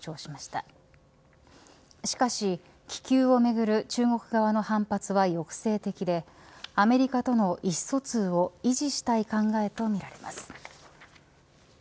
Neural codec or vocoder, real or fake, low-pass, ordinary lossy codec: none; real; none; none